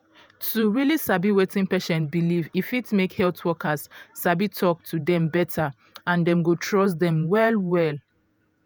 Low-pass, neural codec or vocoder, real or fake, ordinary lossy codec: none; vocoder, 48 kHz, 128 mel bands, Vocos; fake; none